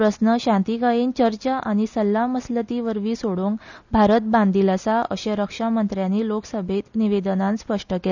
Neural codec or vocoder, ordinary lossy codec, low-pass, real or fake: none; none; 7.2 kHz; real